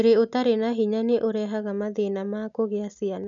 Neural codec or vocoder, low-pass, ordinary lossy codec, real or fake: none; 7.2 kHz; none; real